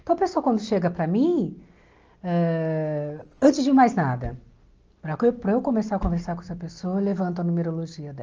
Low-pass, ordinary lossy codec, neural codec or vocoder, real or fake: 7.2 kHz; Opus, 24 kbps; none; real